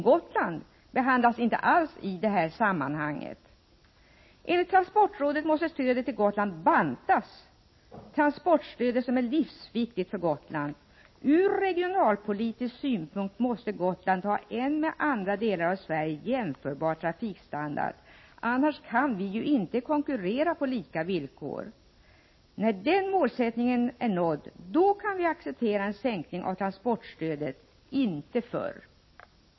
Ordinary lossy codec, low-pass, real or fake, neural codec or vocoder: MP3, 24 kbps; 7.2 kHz; real; none